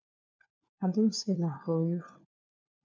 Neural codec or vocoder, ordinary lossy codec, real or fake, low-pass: codec, 16 kHz, 4 kbps, FunCodec, trained on LibriTTS, 50 frames a second; MP3, 64 kbps; fake; 7.2 kHz